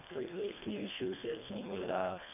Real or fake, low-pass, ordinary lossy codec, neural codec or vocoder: fake; 3.6 kHz; none; codec, 24 kHz, 1.5 kbps, HILCodec